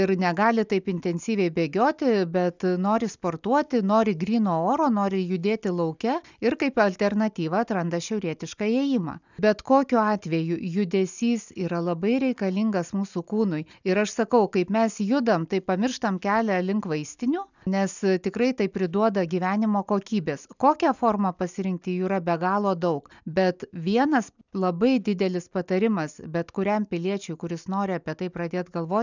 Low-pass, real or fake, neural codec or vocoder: 7.2 kHz; real; none